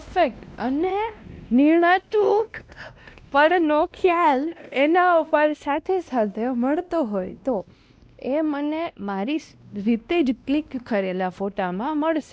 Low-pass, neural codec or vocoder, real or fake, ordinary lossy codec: none; codec, 16 kHz, 1 kbps, X-Codec, WavLM features, trained on Multilingual LibriSpeech; fake; none